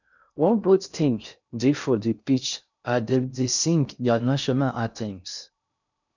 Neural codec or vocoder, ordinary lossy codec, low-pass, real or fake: codec, 16 kHz in and 24 kHz out, 0.6 kbps, FocalCodec, streaming, 2048 codes; none; 7.2 kHz; fake